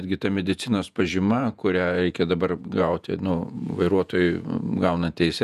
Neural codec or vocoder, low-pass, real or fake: vocoder, 48 kHz, 128 mel bands, Vocos; 14.4 kHz; fake